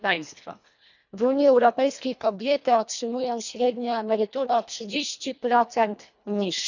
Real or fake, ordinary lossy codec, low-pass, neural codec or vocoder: fake; none; 7.2 kHz; codec, 24 kHz, 1.5 kbps, HILCodec